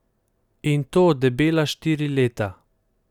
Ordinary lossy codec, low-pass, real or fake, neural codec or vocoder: none; 19.8 kHz; real; none